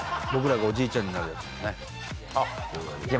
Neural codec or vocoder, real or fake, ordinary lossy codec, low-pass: none; real; none; none